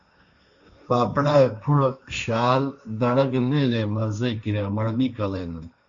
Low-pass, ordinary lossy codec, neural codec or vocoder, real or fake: 7.2 kHz; Opus, 64 kbps; codec, 16 kHz, 1.1 kbps, Voila-Tokenizer; fake